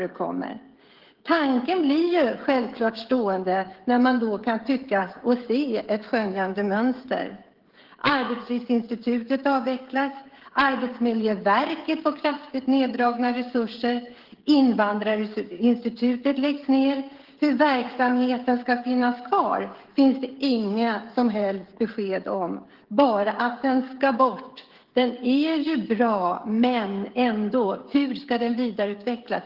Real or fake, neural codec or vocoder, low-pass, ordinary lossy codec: fake; codec, 16 kHz, 16 kbps, FreqCodec, smaller model; 5.4 kHz; Opus, 16 kbps